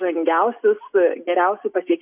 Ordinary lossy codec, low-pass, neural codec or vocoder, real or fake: AAC, 32 kbps; 3.6 kHz; none; real